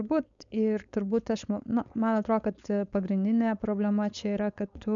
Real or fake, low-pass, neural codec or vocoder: fake; 7.2 kHz; codec, 16 kHz, 4.8 kbps, FACodec